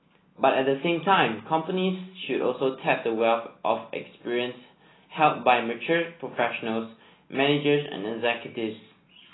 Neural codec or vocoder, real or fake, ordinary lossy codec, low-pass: none; real; AAC, 16 kbps; 7.2 kHz